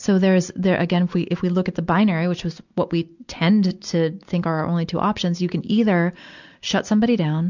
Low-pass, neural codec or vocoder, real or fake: 7.2 kHz; none; real